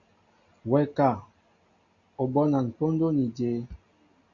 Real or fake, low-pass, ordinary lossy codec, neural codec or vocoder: real; 7.2 kHz; AAC, 48 kbps; none